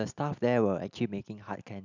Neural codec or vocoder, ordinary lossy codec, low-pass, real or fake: none; none; 7.2 kHz; real